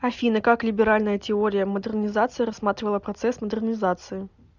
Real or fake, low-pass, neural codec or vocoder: real; 7.2 kHz; none